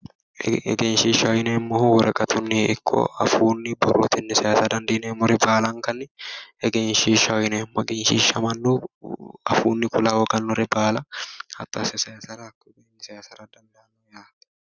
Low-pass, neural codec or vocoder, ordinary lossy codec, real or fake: 7.2 kHz; none; Opus, 64 kbps; real